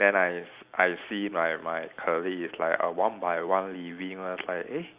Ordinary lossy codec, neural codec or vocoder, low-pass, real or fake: Opus, 64 kbps; none; 3.6 kHz; real